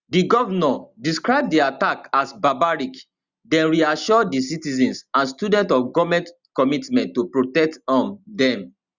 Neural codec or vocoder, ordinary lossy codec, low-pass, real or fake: none; Opus, 64 kbps; 7.2 kHz; real